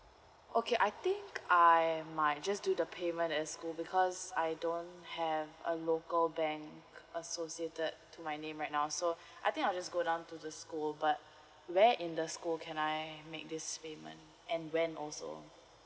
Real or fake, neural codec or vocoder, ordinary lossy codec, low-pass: real; none; none; none